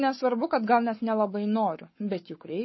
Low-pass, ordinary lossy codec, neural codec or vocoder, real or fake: 7.2 kHz; MP3, 24 kbps; codec, 44.1 kHz, 7.8 kbps, Pupu-Codec; fake